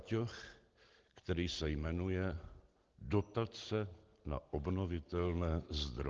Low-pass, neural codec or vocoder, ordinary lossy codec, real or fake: 7.2 kHz; none; Opus, 16 kbps; real